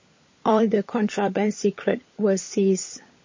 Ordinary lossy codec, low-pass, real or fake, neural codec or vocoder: MP3, 32 kbps; 7.2 kHz; fake; codec, 16 kHz, 16 kbps, FunCodec, trained on LibriTTS, 50 frames a second